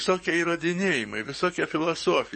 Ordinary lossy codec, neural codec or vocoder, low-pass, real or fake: MP3, 32 kbps; codec, 44.1 kHz, 7.8 kbps, DAC; 10.8 kHz; fake